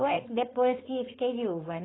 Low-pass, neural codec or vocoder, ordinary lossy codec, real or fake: 7.2 kHz; codec, 16 kHz, 4.8 kbps, FACodec; AAC, 16 kbps; fake